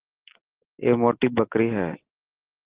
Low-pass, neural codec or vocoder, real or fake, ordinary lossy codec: 3.6 kHz; none; real; Opus, 16 kbps